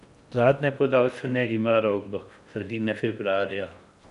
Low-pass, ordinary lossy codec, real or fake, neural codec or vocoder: 10.8 kHz; none; fake; codec, 16 kHz in and 24 kHz out, 0.8 kbps, FocalCodec, streaming, 65536 codes